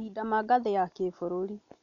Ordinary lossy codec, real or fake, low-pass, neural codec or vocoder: none; real; 7.2 kHz; none